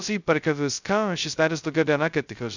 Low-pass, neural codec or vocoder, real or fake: 7.2 kHz; codec, 16 kHz, 0.2 kbps, FocalCodec; fake